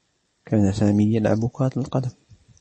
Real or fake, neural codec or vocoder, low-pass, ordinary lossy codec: real; none; 10.8 kHz; MP3, 32 kbps